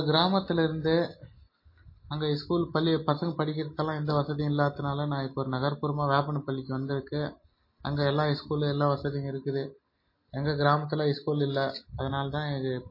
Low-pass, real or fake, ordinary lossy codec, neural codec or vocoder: 5.4 kHz; real; MP3, 24 kbps; none